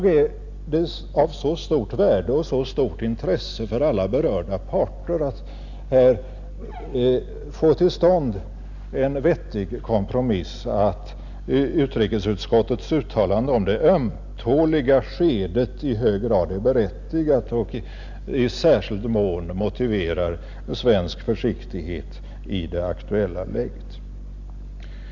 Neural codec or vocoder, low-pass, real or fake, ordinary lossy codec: none; 7.2 kHz; real; none